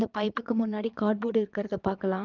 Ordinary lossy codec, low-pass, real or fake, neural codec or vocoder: Opus, 24 kbps; 7.2 kHz; fake; vocoder, 22.05 kHz, 80 mel bands, Vocos